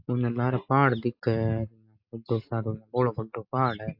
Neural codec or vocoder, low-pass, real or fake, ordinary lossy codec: none; 5.4 kHz; real; AAC, 48 kbps